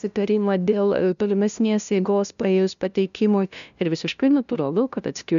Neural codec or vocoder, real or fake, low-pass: codec, 16 kHz, 0.5 kbps, FunCodec, trained on LibriTTS, 25 frames a second; fake; 7.2 kHz